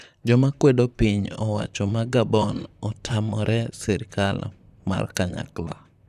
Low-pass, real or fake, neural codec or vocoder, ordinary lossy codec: 14.4 kHz; fake; vocoder, 44.1 kHz, 128 mel bands, Pupu-Vocoder; none